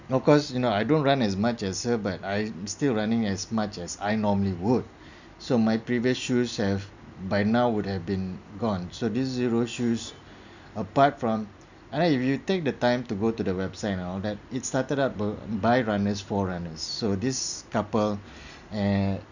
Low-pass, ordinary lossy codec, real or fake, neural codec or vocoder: 7.2 kHz; none; real; none